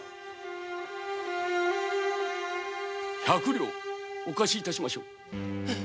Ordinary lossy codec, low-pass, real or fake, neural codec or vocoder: none; none; real; none